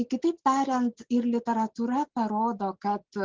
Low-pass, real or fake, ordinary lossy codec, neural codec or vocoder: 7.2 kHz; real; Opus, 16 kbps; none